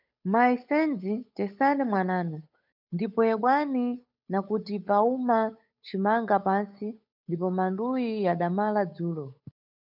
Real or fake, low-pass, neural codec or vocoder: fake; 5.4 kHz; codec, 16 kHz, 8 kbps, FunCodec, trained on Chinese and English, 25 frames a second